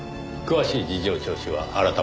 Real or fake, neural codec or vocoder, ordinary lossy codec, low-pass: real; none; none; none